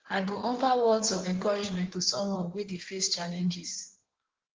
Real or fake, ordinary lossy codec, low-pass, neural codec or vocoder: fake; Opus, 16 kbps; 7.2 kHz; codec, 16 kHz in and 24 kHz out, 1.1 kbps, FireRedTTS-2 codec